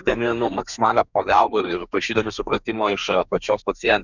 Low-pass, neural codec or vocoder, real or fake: 7.2 kHz; codec, 32 kHz, 1.9 kbps, SNAC; fake